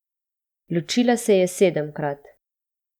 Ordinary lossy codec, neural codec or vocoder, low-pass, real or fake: none; none; 19.8 kHz; real